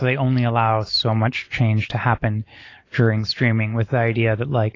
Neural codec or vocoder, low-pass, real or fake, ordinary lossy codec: none; 7.2 kHz; real; AAC, 32 kbps